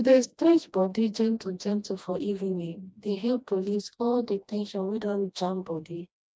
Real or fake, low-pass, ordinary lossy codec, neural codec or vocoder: fake; none; none; codec, 16 kHz, 1 kbps, FreqCodec, smaller model